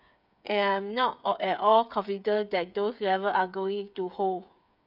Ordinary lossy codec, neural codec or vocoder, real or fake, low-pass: none; codec, 16 kHz, 8 kbps, FreqCodec, smaller model; fake; 5.4 kHz